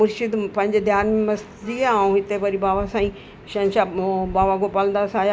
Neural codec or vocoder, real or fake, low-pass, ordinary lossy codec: none; real; none; none